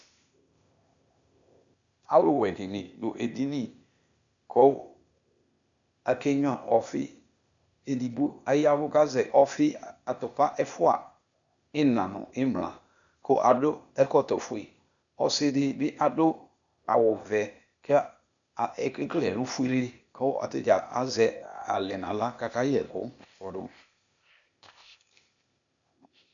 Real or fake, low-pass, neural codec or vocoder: fake; 7.2 kHz; codec, 16 kHz, 0.8 kbps, ZipCodec